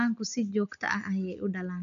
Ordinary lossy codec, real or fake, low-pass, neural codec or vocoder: none; real; 7.2 kHz; none